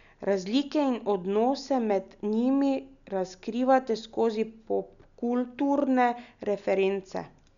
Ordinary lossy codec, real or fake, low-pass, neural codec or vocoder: none; real; 7.2 kHz; none